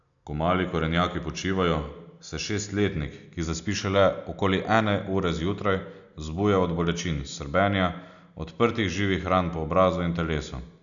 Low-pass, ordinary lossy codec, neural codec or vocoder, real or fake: 7.2 kHz; none; none; real